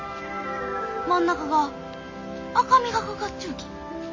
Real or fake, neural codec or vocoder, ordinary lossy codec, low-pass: real; none; MP3, 32 kbps; 7.2 kHz